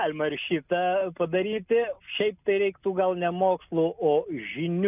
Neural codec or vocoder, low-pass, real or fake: none; 3.6 kHz; real